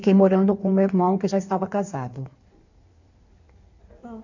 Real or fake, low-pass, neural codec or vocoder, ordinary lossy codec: fake; 7.2 kHz; codec, 16 kHz in and 24 kHz out, 1.1 kbps, FireRedTTS-2 codec; none